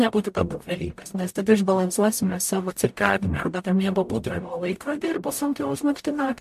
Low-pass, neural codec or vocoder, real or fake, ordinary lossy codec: 14.4 kHz; codec, 44.1 kHz, 0.9 kbps, DAC; fake; MP3, 64 kbps